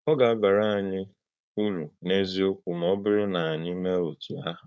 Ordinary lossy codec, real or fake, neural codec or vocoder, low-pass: none; fake; codec, 16 kHz, 4.8 kbps, FACodec; none